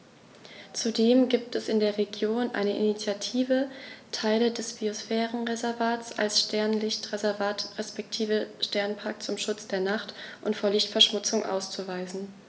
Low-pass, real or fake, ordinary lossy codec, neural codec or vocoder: none; real; none; none